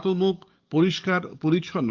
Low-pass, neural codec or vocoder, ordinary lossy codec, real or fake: 7.2 kHz; codec, 44.1 kHz, 7.8 kbps, DAC; Opus, 24 kbps; fake